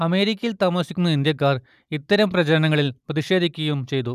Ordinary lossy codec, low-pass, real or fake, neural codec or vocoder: none; 14.4 kHz; real; none